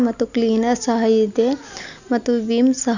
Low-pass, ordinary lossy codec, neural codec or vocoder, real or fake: 7.2 kHz; none; none; real